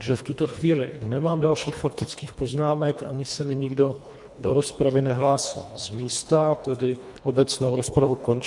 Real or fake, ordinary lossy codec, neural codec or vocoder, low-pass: fake; MP3, 64 kbps; codec, 24 kHz, 1.5 kbps, HILCodec; 10.8 kHz